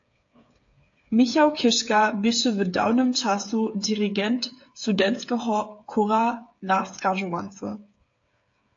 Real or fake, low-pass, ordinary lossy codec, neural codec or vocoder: fake; 7.2 kHz; AAC, 48 kbps; codec, 16 kHz, 8 kbps, FreqCodec, smaller model